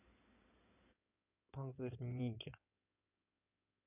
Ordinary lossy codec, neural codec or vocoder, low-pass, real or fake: none; codec, 16 kHz in and 24 kHz out, 2.2 kbps, FireRedTTS-2 codec; 3.6 kHz; fake